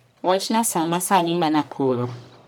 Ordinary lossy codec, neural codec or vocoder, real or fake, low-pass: none; codec, 44.1 kHz, 1.7 kbps, Pupu-Codec; fake; none